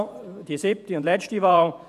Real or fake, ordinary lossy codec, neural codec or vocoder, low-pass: fake; none; vocoder, 48 kHz, 128 mel bands, Vocos; 14.4 kHz